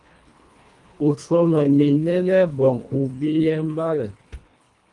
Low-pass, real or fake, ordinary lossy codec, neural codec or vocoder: 10.8 kHz; fake; Opus, 32 kbps; codec, 24 kHz, 1.5 kbps, HILCodec